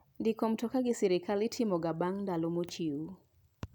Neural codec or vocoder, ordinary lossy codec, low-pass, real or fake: none; none; none; real